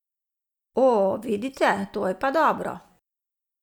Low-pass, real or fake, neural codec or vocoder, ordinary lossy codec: 19.8 kHz; real; none; none